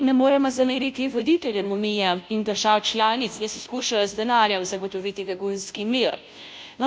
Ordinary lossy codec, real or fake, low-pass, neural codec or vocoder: none; fake; none; codec, 16 kHz, 0.5 kbps, FunCodec, trained on Chinese and English, 25 frames a second